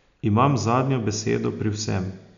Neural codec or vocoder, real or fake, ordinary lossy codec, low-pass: none; real; none; 7.2 kHz